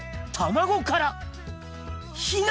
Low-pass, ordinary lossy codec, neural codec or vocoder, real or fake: none; none; none; real